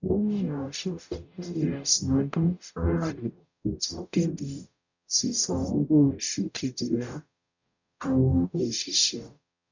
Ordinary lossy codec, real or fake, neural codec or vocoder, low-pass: none; fake; codec, 44.1 kHz, 0.9 kbps, DAC; 7.2 kHz